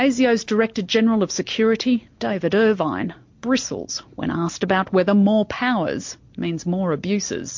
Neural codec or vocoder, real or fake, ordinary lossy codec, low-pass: none; real; MP3, 48 kbps; 7.2 kHz